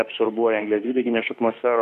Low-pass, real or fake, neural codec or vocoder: 14.4 kHz; fake; autoencoder, 48 kHz, 32 numbers a frame, DAC-VAE, trained on Japanese speech